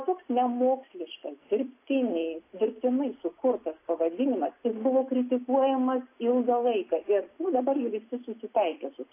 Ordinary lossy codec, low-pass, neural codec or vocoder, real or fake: AAC, 24 kbps; 3.6 kHz; none; real